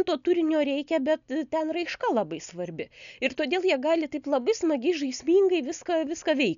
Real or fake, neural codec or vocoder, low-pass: real; none; 7.2 kHz